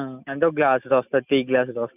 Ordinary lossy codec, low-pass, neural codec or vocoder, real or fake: none; 3.6 kHz; none; real